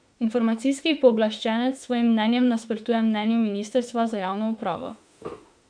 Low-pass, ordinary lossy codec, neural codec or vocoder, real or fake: 9.9 kHz; none; autoencoder, 48 kHz, 32 numbers a frame, DAC-VAE, trained on Japanese speech; fake